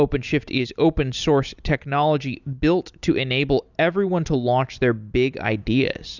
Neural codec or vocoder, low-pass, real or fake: none; 7.2 kHz; real